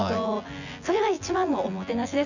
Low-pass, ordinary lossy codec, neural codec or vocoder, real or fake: 7.2 kHz; none; vocoder, 24 kHz, 100 mel bands, Vocos; fake